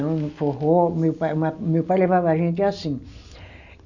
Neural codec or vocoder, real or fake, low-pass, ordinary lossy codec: none; real; 7.2 kHz; none